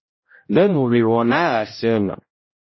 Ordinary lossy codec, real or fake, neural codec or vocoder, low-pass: MP3, 24 kbps; fake; codec, 16 kHz, 0.5 kbps, X-Codec, HuBERT features, trained on general audio; 7.2 kHz